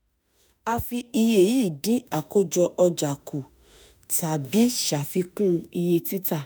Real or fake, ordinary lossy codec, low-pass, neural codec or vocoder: fake; none; none; autoencoder, 48 kHz, 32 numbers a frame, DAC-VAE, trained on Japanese speech